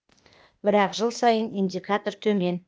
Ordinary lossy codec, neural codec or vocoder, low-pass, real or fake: none; codec, 16 kHz, 0.8 kbps, ZipCodec; none; fake